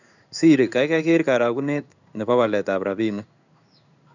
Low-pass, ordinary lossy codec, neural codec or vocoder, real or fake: 7.2 kHz; none; codec, 16 kHz in and 24 kHz out, 1 kbps, XY-Tokenizer; fake